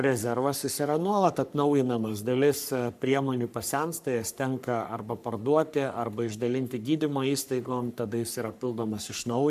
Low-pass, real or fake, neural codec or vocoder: 14.4 kHz; fake; codec, 44.1 kHz, 3.4 kbps, Pupu-Codec